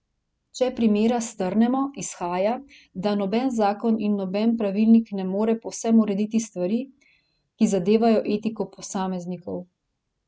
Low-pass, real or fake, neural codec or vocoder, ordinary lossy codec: none; real; none; none